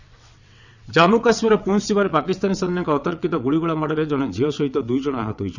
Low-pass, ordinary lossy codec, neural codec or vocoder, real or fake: 7.2 kHz; none; vocoder, 22.05 kHz, 80 mel bands, WaveNeXt; fake